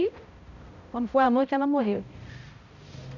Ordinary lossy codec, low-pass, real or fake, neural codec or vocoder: none; 7.2 kHz; fake; codec, 16 kHz, 0.5 kbps, X-Codec, HuBERT features, trained on balanced general audio